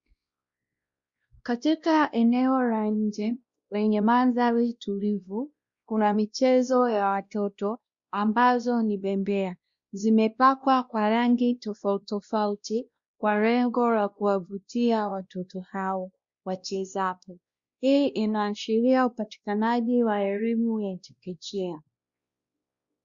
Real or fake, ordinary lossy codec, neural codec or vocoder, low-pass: fake; Opus, 64 kbps; codec, 16 kHz, 1 kbps, X-Codec, WavLM features, trained on Multilingual LibriSpeech; 7.2 kHz